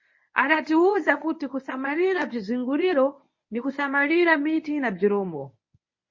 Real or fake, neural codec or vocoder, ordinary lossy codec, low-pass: fake; codec, 24 kHz, 0.9 kbps, WavTokenizer, medium speech release version 1; MP3, 32 kbps; 7.2 kHz